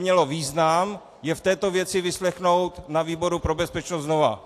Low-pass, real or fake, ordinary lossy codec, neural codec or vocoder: 14.4 kHz; real; AAC, 64 kbps; none